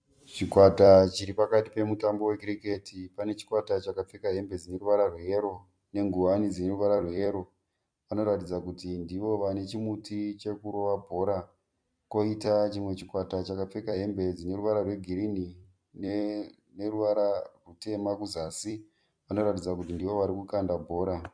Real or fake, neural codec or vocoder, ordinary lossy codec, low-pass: fake; vocoder, 44.1 kHz, 128 mel bands every 256 samples, BigVGAN v2; MP3, 64 kbps; 9.9 kHz